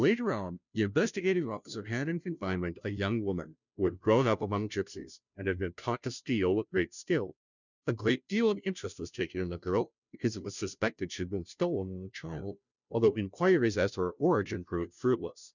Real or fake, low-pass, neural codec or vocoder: fake; 7.2 kHz; codec, 16 kHz, 0.5 kbps, FunCodec, trained on Chinese and English, 25 frames a second